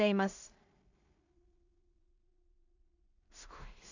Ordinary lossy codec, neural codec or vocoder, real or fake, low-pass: none; codec, 16 kHz in and 24 kHz out, 0.4 kbps, LongCat-Audio-Codec, two codebook decoder; fake; 7.2 kHz